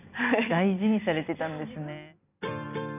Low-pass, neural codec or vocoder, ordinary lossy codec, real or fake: 3.6 kHz; vocoder, 44.1 kHz, 128 mel bands every 256 samples, BigVGAN v2; none; fake